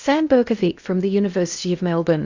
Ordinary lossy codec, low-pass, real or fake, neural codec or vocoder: Opus, 64 kbps; 7.2 kHz; fake; codec, 16 kHz in and 24 kHz out, 0.6 kbps, FocalCodec, streaming, 2048 codes